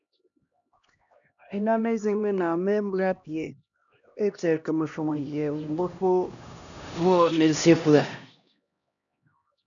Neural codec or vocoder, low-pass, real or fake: codec, 16 kHz, 1 kbps, X-Codec, HuBERT features, trained on LibriSpeech; 7.2 kHz; fake